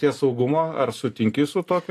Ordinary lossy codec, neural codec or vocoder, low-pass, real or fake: AAC, 64 kbps; none; 14.4 kHz; real